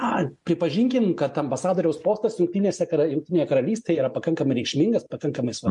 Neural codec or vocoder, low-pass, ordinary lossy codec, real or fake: none; 10.8 kHz; MP3, 64 kbps; real